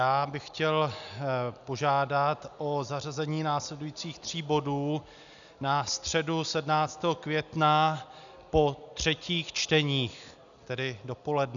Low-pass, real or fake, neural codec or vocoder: 7.2 kHz; real; none